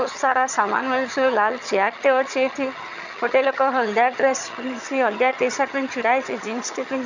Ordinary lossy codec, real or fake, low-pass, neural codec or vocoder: none; fake; 7.2 kHz; vocoder, 22.05 kHz, 80 mel bands, HiFi-GAN